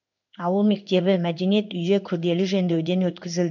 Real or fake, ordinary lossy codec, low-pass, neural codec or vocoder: fake; none; 7.2 kHz; codec, 16 kHz in and 24 kHz out, 1 kbps, XY-Tokenizer